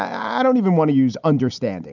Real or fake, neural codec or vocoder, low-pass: real; none; 7.2 kHz